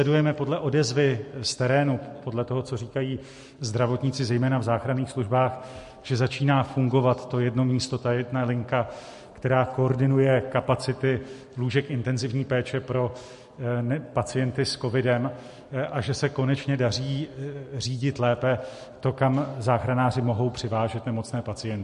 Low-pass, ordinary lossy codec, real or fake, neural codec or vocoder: 14.4 kHz; MP3, 48 kbps; real; none